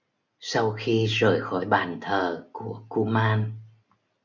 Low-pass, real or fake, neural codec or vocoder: 7.2 kHz; real; none